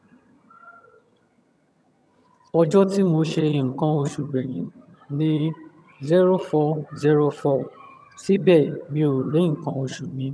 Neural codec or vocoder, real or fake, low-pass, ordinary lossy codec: vocoder, 22.05 kHz, 80 mel bands, HiFi-GAN; fake; none; none